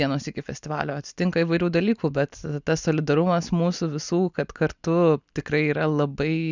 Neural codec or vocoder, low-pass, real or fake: none; 7.2 kHz; real